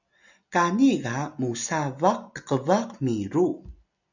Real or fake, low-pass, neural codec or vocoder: real; 7.2 kHz; none